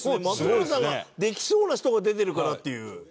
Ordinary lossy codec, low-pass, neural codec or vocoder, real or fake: none; none; none; real